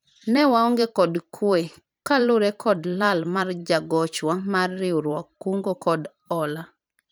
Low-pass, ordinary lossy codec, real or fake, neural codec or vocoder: none; none; fake; vocoder, 44.1 kHz, 128 mel bands every 512 samples, BigVGAN v2